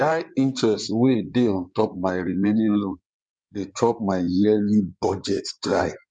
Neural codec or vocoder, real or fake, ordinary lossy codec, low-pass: codec, 16 kHz in and 24 kHz out, 2.2 kbps, FireRedTTS-2 codec; fake; none; 9.9 kHz